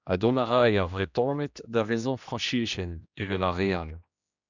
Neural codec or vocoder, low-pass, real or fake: codec, 16 kHz, 1 kbps, X-Codec, HuBERT features, trained on general audio; 7.2 kHz; fake